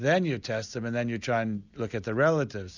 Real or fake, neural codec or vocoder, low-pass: real; none; 7.2 kHz